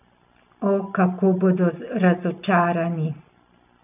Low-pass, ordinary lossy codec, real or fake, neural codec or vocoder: 3.6 kHz; none; real; none